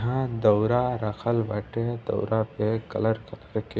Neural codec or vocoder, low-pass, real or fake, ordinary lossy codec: none; none; real; none